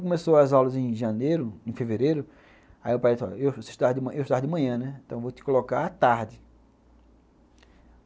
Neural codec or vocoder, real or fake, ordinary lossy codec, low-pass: none; real; none; none